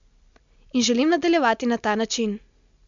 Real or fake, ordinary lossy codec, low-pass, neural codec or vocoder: real; MP3, 64 kbps; 7.2 kHz; none